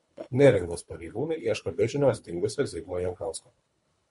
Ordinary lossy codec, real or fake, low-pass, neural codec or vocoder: MP3, 48 kbps; fake; 10.8 kHz; codec, 24 kHz, 3 kbps, HILCodec